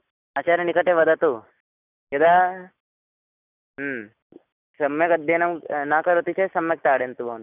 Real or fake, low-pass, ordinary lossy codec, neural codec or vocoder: real; 3.6 kHz; none; none